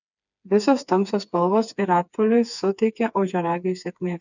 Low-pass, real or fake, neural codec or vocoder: 7.2 kHz; fake; codec, 16 kHz, 4 kbps, FreqCodec, smaller model